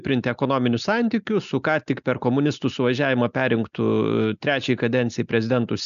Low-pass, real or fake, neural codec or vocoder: 7.2 kHz; real; none